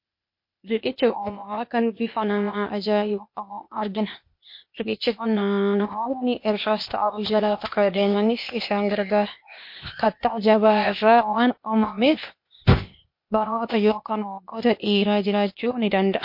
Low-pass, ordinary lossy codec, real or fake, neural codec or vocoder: 5.4 kHz; MP3, 32 kbps; fake; codec, 16 kHz, 0.8 kbps, ZipCodec